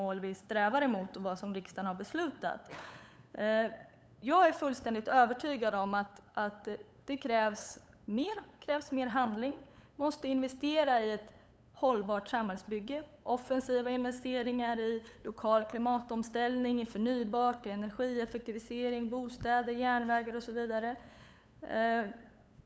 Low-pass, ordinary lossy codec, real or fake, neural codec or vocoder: none; none; fake; codec, 16 kHz, 8 kbps, FunCodec, trained on LibriTTS, 25 frames a second